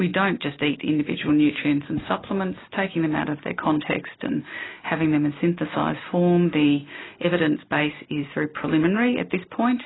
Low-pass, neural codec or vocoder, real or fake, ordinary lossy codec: 7.2 kHz; none; real; AAC, 16 kbps